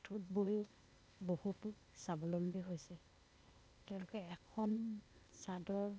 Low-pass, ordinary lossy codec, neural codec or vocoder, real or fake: none; none; codec, 16 kHz, 0.8 kbps, ZipCodec; fake